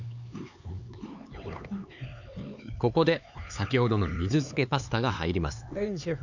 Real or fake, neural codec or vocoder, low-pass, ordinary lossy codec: fake; codec, 16 kHz, 4 kbps, X-Codec, HuBERT features, trained on LibriSpeech; 7.2 kHz; none